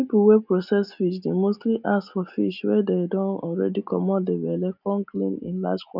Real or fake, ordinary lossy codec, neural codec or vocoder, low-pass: real; none; none; 5.4 kHz